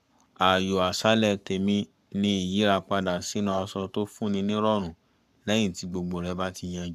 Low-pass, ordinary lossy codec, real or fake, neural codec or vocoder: 14.4 kHz; none; fake; codec, 44.1 kHz, 7.8 kbps, Pupu-Codec